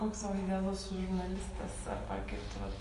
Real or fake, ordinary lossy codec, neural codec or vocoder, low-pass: real; MP3, 48 kbps; none; 14.4 kHz